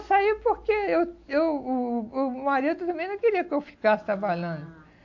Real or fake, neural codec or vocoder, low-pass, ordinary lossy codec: real; none; 7.2 kHz; AAC, 48 kbps